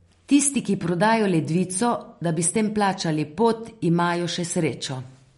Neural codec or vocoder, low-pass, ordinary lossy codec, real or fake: none; 19.8 kHz; MP3, 48 kbps; real